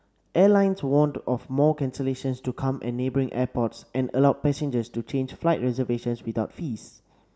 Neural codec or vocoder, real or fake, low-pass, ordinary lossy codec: none; real; none; none